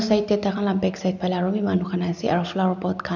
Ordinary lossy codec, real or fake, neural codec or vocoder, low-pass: none; real; none; 7.2 kHz